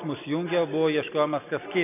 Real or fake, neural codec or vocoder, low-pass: real; none; 3.6 kHz